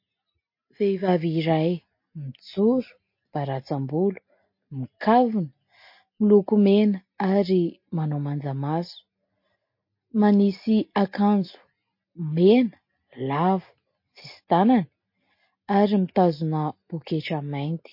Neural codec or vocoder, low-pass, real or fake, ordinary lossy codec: none; 5.4 kHz; real; MP3, 32 kbps